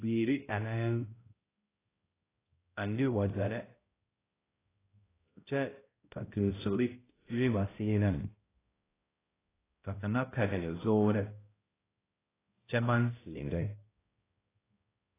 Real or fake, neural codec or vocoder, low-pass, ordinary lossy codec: fake; codec, 16 kHz, 0.5 kbps, X-Codec, HuBERT features, trained on balanced general audio; 3.6 kHz; AAC, 16 kbps